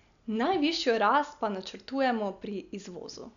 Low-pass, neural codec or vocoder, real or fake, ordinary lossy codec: 7.2 kHz; none; real; none